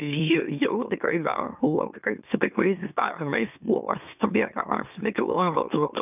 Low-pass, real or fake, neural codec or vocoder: 3.6 kHz; fake; autoencoder, 44.1 kHz, a latent of 192 numbers a frame, MeloTTS